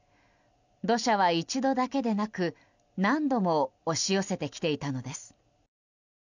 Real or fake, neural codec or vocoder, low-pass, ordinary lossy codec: real; none; 7.2 kHz; none